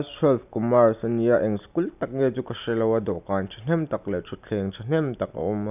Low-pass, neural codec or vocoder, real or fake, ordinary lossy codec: 3.6 kHz; none; real; none